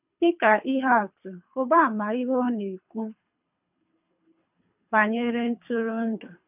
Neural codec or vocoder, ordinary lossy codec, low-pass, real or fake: codec, 24 kHz, 3 kbps, HILCodec; none; 3.6 kHz; fake